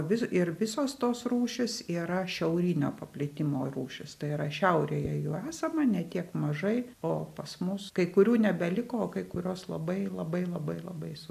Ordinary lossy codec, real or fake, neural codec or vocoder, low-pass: AAC, 96 kbps; real; none; 14.4 kHz